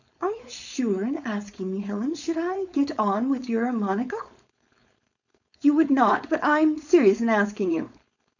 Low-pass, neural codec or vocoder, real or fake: 7.2 kHz; codec, 16 kHz, 4.8 kbps, FACodec; fake